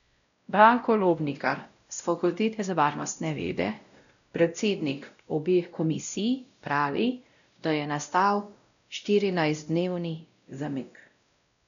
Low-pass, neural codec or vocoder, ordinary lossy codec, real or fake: 7.2 kHz; codec, 16 kHz, 0.5 kbps, X-Codec, WavLM features, trained on Multilingual LibriSpeech; none; fake